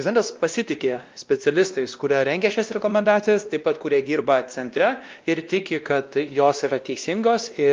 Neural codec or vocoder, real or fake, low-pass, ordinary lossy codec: codec, 16 kHz, 1 kbps, X-Codec, WavLM features, trained on Multilingual LibriSpeech; fake; 7.2 kHz; Opus, 24 kbps